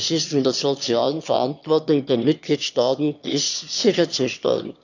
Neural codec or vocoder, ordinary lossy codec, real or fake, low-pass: autoencoder, 22.05 kHz, a latent of 192 numbers a frame, VITS, trained on one speaker; none; fake; 7.2 kHz